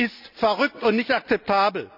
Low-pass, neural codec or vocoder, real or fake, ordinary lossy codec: 5.4 kHz; none; real; none